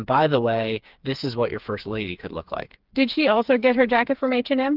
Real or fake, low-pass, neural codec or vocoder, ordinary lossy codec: fake; 5.4 kHz; codec, 16 kHz, 4 kbps, FreqCodec, smaller model; Opus, 32 kbps